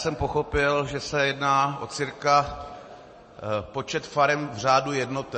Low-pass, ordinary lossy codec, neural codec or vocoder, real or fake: 10.8 kHz; MP3, 32 kbps; none; real